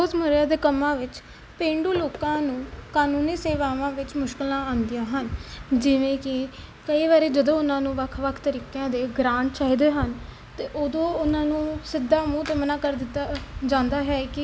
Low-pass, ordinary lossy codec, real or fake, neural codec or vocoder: none; none; real; none